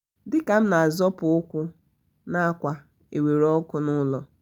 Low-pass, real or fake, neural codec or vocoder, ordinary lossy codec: 19.8 kHz; real; none; none